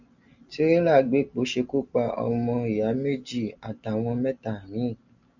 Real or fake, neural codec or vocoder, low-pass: real; none; 7.2 kHz